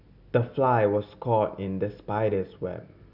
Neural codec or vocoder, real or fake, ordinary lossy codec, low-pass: none; real; none; 5.4 kHz